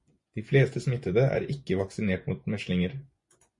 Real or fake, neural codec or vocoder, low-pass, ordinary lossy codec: fake; vocoder, 24 kHz, 100 mel bands, Vocos; 10.8 kHz; MP3, 48 kbps